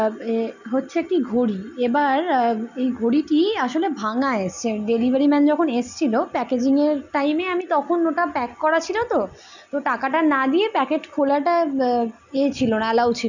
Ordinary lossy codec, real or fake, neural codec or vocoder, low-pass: none; real; none; 7.2 kHz